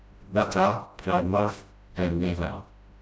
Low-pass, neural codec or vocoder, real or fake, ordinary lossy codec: none; codec, 16 kHz, 0.5 kbps, FreqCodec, smaller model; fake; none